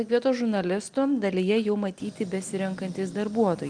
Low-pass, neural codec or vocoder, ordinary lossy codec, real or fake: 9.9 kHz; none; Opus, 24 kbps; real